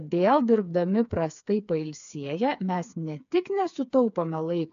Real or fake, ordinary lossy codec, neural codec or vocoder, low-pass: fake; AAC, 96 kbps; codec, 16 kHz, 4 kbps, FreqCodec, smaller model; 7.2 kHz